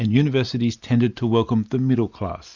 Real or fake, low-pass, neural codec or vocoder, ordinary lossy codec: real; 7.2 kHz; none; Opus, 64 kbps